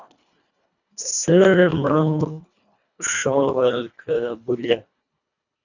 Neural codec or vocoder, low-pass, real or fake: codec, 24 kHz, 1.5 kbps, HILCodec; 7.2 kHz; fake